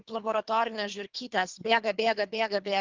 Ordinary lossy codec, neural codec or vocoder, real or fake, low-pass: Opus, 32 kbps; codec, 24 kHz, 3 kbps, HILCodec; fake; 7.2 kHz